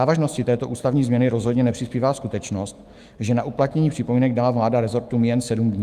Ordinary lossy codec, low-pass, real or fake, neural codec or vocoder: Opus, 32 kbps; 14.4 kHz; fake; autoencoder, 48 kHz, 128 numbers a frame, DAC-VAE, trained on Japanese speech